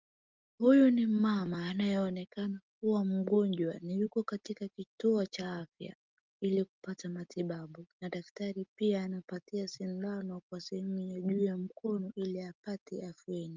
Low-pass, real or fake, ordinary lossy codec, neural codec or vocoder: 7.2 kHz; real; Opus, 32 kbps; none